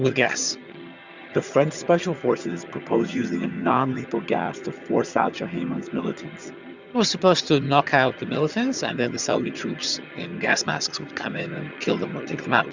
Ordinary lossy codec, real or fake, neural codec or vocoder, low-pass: Opus, 64 kbps; fake; vocoder, 22.05 kHz, 80 mel bands, HiFi-GAN; 7.2 kHz